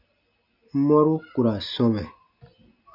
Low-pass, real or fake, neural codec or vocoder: 5.4 kHz; real; none